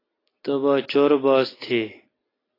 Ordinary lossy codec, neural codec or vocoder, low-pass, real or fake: AAC, 24 kbps; none; 5.4 kHz; real